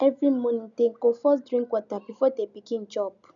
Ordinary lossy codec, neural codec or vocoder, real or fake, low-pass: none; none; real; 7.2 kHz